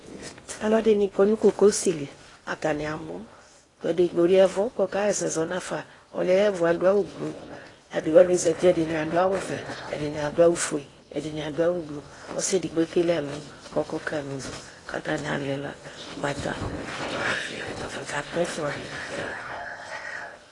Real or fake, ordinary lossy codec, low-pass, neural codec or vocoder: fake; AAC, 32 kbps; 10.8 kHz; codec, 16 kHz in and 24 kHz out, 0.8 kbps, FocalCodec, streaming, 65536 codes